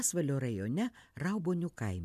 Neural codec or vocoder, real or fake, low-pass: none; real; 14.4 kHz